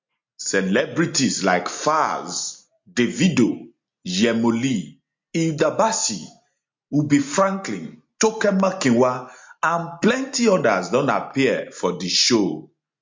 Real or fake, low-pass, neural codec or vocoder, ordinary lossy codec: real; 7.2 kHz; none; MP3, 48 kbps